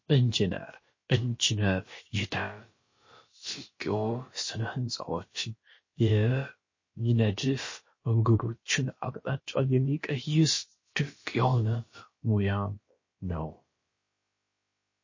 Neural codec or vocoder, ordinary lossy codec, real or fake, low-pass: codec, 16 kHz, about 1 kbps, DyCAST, with the encoder's durations; MP3, 32 kbps; fake; 7.2 kHz